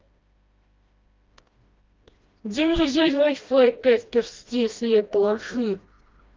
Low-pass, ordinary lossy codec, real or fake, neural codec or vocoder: 7.2 kHz; Opus, 24 kbps; fake; codec, 16 kHz, 1 kbps, FreqCodec, smaller model